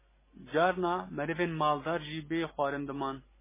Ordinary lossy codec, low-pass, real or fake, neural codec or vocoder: MP3, 16 kbps; 3.6 kHz; real; none